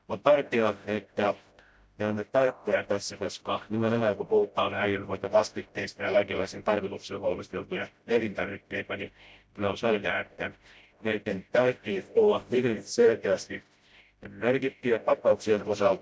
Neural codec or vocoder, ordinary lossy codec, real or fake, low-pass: codec, 16 kHz, 0.5 kbps, FreqCodec, smaller model; none; fake; none